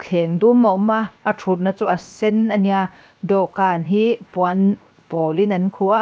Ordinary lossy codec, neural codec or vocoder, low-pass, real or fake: none; codec, 16 kHz, 0.7 kbps, FocalCodec; none; fake